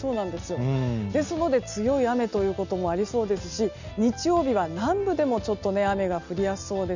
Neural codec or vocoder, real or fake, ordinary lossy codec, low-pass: none; real; none; 7.2 kHz